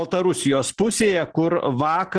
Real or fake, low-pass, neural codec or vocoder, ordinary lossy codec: real; 9.9 kHz; none; Opus, 16 kbps